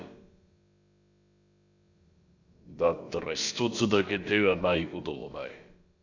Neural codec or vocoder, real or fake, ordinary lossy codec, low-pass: codec, 16 kHz, about 1 kbps, DyCAST, with the encoder's durations; fake; Opus, 64 kbps; 7.2 kHz